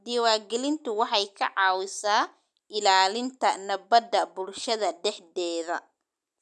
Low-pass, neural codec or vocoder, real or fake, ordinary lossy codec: none; none; real; none